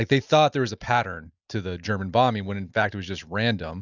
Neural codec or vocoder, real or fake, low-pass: none; real; 7.2 kHz